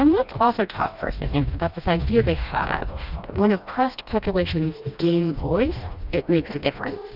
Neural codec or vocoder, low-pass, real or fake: codec, 16 kHz, 1 kbps, FreqCodec, smaller model; 5.4 kHz; fake